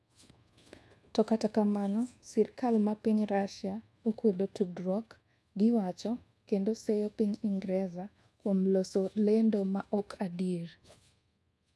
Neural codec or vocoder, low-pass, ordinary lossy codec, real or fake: codec, 24 kHz, 1.2 kbps, DualCodec; none; none; fake